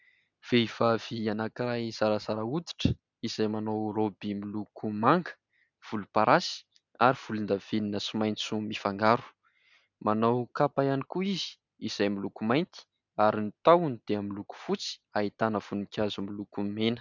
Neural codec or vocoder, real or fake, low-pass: none; real; 7.2 kHz